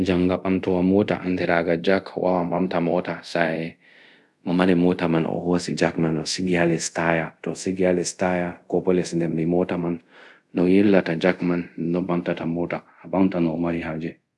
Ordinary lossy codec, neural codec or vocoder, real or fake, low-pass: none; codec, 24 kHz, 0.5 kbps, DualCodec; fake; none